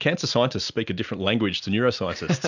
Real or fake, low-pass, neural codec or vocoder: real; 7.2 kHz; none